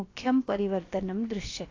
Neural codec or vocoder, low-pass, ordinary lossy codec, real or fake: codec, 16 kHz, about 1 kbps, DyCAST, with the encoder's durations; 7.2 kHz; AAC, 32 kbps; fake